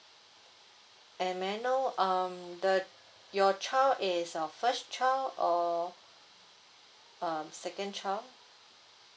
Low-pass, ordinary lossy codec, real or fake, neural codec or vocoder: none; none; real; none